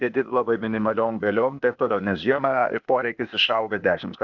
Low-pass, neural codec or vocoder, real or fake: 7.2 kHz; codec, 16 kHz, 0.8 kbps, ZipCodec; fake